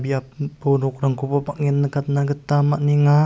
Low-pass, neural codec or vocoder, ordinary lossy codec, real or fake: none; none; none; real